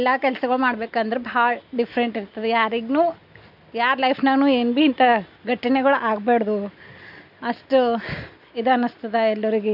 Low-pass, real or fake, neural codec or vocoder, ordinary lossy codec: 5.4 kHz; real; none; none